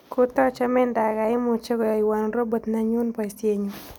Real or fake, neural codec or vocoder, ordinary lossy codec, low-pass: real; none; none; none